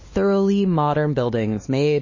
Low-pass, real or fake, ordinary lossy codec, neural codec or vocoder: 7.2 kHz; fake; MP3, 32 kbps; codec, 16 kHz, 2 kbps, X-Codec, HuBERT features, trained on LibriSpeech